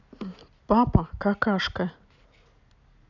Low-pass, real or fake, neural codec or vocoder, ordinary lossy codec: 7.2 kHz; real; none; none